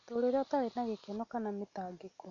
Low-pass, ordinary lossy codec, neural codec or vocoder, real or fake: 7.2 kHz; none; none; real